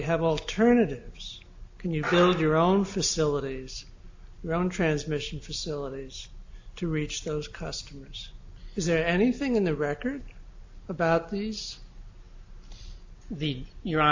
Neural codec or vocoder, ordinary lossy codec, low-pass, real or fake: none; AAC, 48 kbps; 7.2 kHz; real